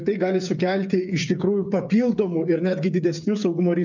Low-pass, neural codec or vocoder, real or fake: 7.2 kHz; codec, 16 kHz, 8 kbps, FreqCodec, smaller model; fake